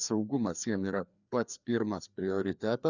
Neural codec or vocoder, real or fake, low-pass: codec, 16 kHz, 2 kbps, FreqCodec, larger model; fake; 7.2 kHz